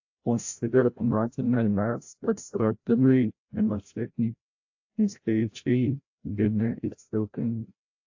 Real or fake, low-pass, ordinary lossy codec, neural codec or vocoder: fake; 7.2 kHz; AAC, 48 kbps; codec, 16 kHz, 0.5 kbps, FreqCodec, larger model